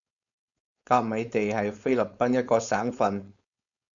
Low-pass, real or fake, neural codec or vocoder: 7.2 kHz; fake; codec, 16 kHz, 4.8 kbps, FACodec